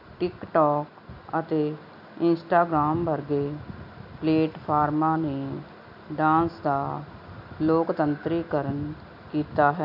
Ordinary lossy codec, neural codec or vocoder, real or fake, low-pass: none; none; real; 5.4 kHz